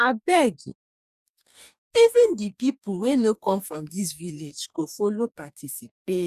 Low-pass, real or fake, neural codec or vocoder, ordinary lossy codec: 14.4 kHz; fake; codec, 44.1 kHz, 2.6 kbps, DAC; none